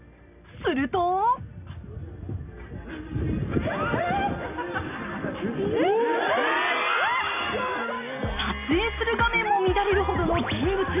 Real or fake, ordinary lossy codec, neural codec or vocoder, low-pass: real; none; none; 3.6 kHz